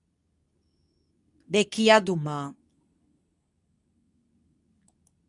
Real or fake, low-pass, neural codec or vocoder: fake; 10.8 kHz; codec, 24 kHz, 0.9 kbps, WavTokenizer, medium speech release version 2